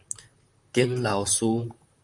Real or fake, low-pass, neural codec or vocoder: fake; 10.8 kHz; vocoder, 44.1 kHz, 128 mel bands, Pupu-Vocoder